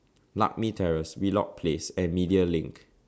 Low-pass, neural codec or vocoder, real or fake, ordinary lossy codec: none; none; real; none